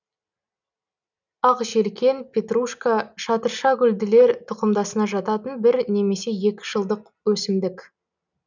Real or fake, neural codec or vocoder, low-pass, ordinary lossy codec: real; none; 7.2 kHz; none